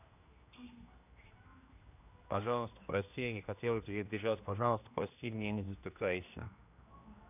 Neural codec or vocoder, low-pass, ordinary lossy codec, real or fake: codec, 16 kHz, 1 kbps, X-Codec, HuBERT features, trained on general audio; 3.6 kHz; none; fake